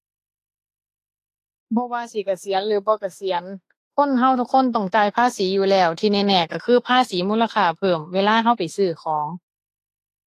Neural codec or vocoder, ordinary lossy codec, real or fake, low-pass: autoencoder, 48 kHz, 32 numbers a frame, DAC-VAE, trained on Japanese speech; AAC, 48 kbps; fake; 14.4 kHz